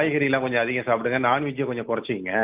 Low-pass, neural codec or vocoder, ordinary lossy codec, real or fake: 3.6 kHz; none; Opus, 24 kbps; real